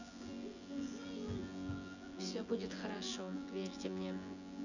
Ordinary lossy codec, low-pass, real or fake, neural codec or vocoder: none; 7.2 kHz; fake; vocoder, 24 kHz, 100 mel bands, Vocos